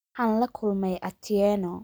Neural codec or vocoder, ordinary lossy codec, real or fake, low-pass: none; none; real; none